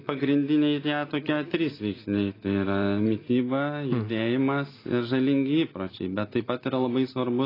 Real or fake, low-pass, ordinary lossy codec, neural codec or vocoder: real; 5.4 kHz; AAC, 24 kbps; none